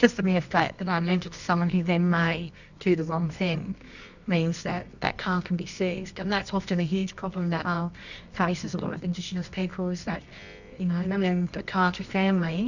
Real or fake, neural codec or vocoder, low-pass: fake; codec, 24 kHz, 0.9 kbps, WavTokenizer, medium music audio release; 7.2 kHz